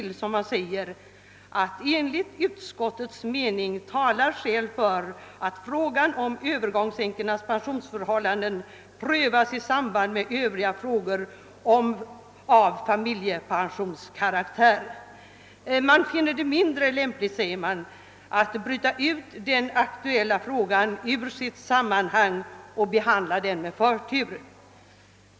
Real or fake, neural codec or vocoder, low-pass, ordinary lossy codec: real; none; none; none